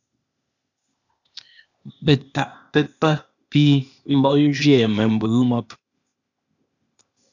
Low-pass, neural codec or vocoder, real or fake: 7.2 kHz; codec, 16 kHz, 0.8 kbps, ZipCodec; fake